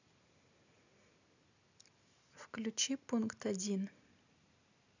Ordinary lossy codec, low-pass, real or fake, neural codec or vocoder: none; 7.2 kHz; real; none